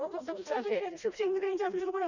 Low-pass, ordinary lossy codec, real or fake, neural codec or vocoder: 7.2 kHz; none; fake; codec, 16 kHz, 1 kbps, FreqCodec, smaller model